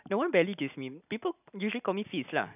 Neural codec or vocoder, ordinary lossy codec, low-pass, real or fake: none; none; 3.6 kHz; real